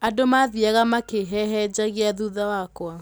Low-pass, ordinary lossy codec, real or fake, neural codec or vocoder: none; none; real; none